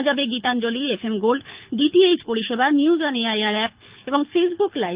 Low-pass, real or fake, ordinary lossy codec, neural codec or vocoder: 3.6 kHz; fake; Opus, 32 kbps; codec, 24 kHz, 6 kbps, HILCodec